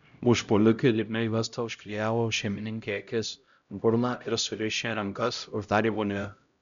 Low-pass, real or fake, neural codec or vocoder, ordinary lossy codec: 7.2 kHz; fake; codec, 16 kHz, 0.5 kbps, X-Codec, HuBERT features, trained on LibriSpeech; MP3, 96 kbps